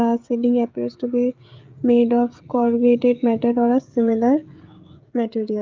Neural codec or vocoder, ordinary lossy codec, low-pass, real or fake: codec, 16 kHz, 16 kbps, FreqCodec, smaller model; Opus, 32 kbps; 7.2 kHz; fake